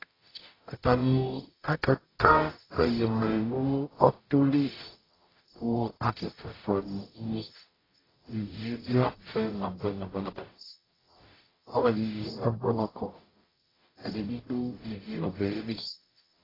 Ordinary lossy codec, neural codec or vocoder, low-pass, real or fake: AAC, 24 kbps; codec, 44.1 kHz, 0.9 kbps, DAC; 5.4 kHz; fake